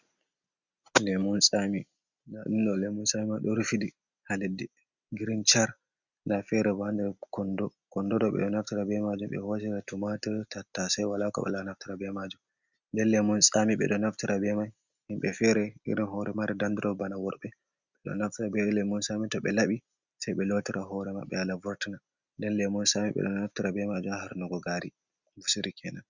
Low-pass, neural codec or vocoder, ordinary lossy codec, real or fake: 7.2 kHz; none; Opus, 64 kbps; real